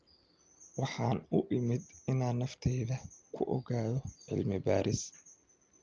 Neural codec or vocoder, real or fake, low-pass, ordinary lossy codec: none; real; 7.2 kHz; Opus, 24 kbps